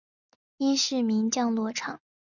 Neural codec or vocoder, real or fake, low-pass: none; real; 7.2 kHz